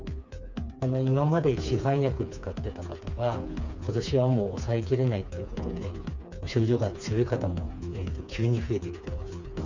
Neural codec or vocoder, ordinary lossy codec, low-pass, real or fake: codec, 16 kHz, 4 kbps, FreqCodec, smaller model; none; 7.2 kHz; fake